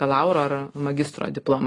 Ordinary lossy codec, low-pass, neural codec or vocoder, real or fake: AAC, 32 kbps; 10.8 kHz; none; real